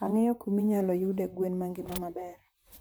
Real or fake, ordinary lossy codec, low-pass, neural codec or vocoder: fake; none; none; vocoder, 44.1 kHz, 128 mel bands, Pupu-Vocoder